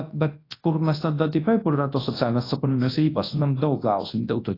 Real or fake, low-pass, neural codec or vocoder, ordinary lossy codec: fake; 5.4 kHz; codec, 24 kHz, 0.9 kbps, WavTokenizer, large speech release; AAC, 24 kbps